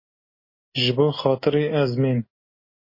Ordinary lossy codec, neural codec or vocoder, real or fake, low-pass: MP3, 24 kbps; none; real; 5.4 kHz